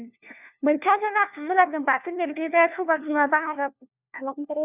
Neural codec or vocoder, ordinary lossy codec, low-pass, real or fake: codec, 16 kHz, 1 kbps, FunCodec, trained on LibriTTS, 50 frames a second; none; 3.6 kHz; fake